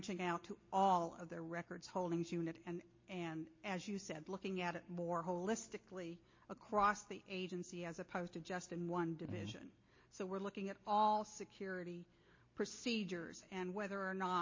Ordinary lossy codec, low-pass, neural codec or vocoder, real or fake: MP3, 32 kbps; 7.2 kHz; none; real